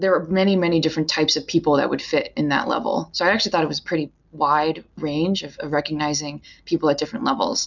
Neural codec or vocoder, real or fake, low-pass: none; real; 7.2 kHz